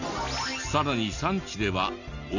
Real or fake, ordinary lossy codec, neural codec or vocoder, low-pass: real; none; none; 7.2 kHz